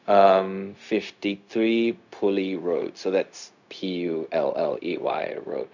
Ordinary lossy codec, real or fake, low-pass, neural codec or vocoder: none; fake; 7.2 kHz; codec, 16 kHz, 0.4 kbps, LongCat-Audio-Codec